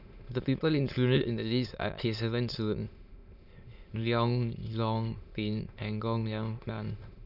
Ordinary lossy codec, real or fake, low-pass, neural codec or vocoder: none; fake; 5.4 kHz; autoencoder, 22.05 kHz, a latent of 192 numbers a frame, VITS, trained on many speakers